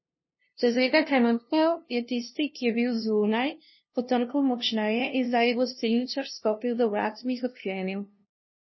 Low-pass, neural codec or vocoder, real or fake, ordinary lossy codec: 7.2 kHz; codec, 16 kHz, 0.5 kbps, FunCodec, trained on LibriTTS, 25 frames a second; fake; MP3, 24 kbps